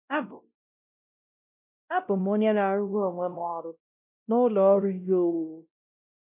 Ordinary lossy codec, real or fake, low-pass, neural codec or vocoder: none; fake; 3.6 kHz; codec, 16 kHz, 0.5 kbps, X-Codec, WavLM features, trained on Multilingual LibriSpeech